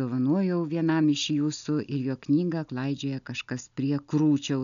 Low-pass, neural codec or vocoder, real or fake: 7.2 kHz; none; real